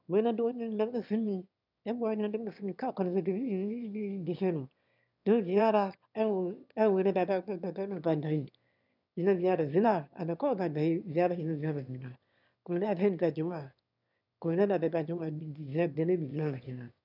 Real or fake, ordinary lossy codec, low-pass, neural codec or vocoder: fake; none; 5.4 kHz; autoencoder, 22.05 kHz, a latent of 192 numbers a frame, VITS, trained on one speaker